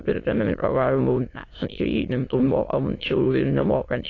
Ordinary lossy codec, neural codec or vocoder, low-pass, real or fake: AAC, 32 kbps; autoencoder, 22.05 kHz, a latent of 192 numbers a frame, VITS, trained on many speakers; 7.2 kHz; fake